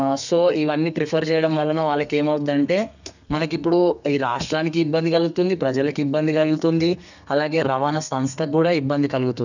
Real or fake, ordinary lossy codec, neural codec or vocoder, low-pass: fake; none; codec, 32 kHz, 1.9 kbps, SNAC; 7.2 kHz